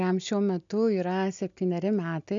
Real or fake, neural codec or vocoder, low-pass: real; none; 7.2 kHz